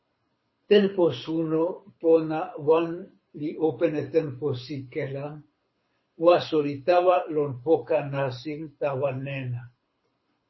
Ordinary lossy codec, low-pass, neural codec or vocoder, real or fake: MP3, 24 kbps; 7.2 kHz; codec, 24 kHz, 6 kbps, HILCodec; fake